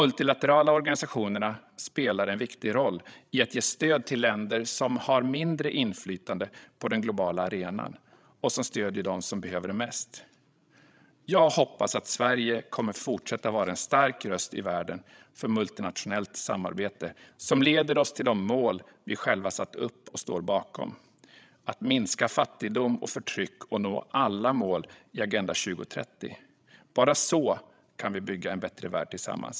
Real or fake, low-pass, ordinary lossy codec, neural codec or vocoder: fake; none; none; codec, 16 kHz, 16 kbps, FreqCodec, larger model